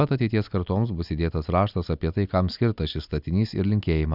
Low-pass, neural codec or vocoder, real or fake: 5.4 kHz; none; real